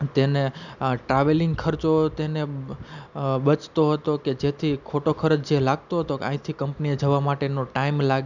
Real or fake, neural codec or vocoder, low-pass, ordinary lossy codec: real; none; 7.2 kHz; none